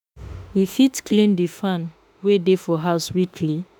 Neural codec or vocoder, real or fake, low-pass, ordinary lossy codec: autoencoder, 48 kHz, 32 numbers a frame, DAC-VAE, trained on Japanese speech; fake; none; none